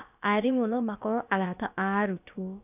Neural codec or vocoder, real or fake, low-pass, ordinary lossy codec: codec, 16 kHz, about 1 kbps, DyCAST, with the encoder's durations; fake; 3.6 kHz; none